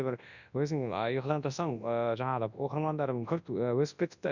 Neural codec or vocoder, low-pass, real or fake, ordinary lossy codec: codec, 24 kHz, 0.9 kbps, WavTokenizer, large speech release; 7.2 kHz; fake; none